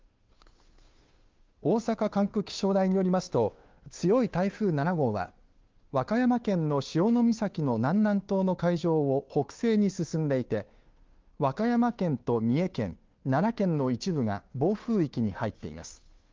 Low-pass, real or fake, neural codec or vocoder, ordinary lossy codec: 7.2 kHz; fake; codec, 16 kHz, 2 kbps, FunCodec, trained on Chinese and English, 25 frames a second; Opus, 32 kbps